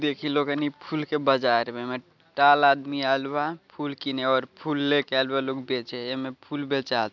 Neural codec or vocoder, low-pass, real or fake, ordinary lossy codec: none; 7.2 kHz; real; none